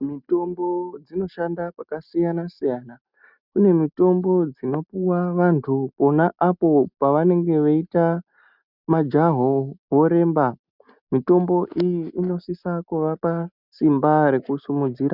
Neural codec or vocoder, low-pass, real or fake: none; 5.4 kHz; real